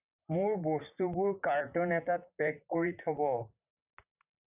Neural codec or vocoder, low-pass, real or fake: vocoder, 44.1 kHz, 128 mel bands, Pupu-Vocoder; 3.6 kHz; fake